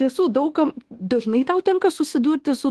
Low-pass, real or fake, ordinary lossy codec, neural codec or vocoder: 10.8 kHz; fake; Opus, 16 kbps; codec, 24 kHz, 1.2 kbps, DualCodec